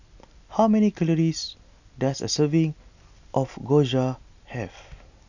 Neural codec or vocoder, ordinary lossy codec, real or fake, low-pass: none; none; real; 7.2 kHz